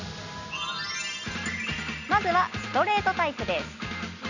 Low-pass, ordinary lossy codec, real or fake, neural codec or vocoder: 7.2 kHz; none; real; none